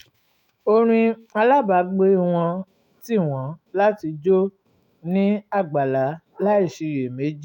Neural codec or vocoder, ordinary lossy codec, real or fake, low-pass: autoencoder, 48 kHz, 128 numbers a frame, DAC-VAE, trained on Japanese speech; none; fake; 19.8 kHz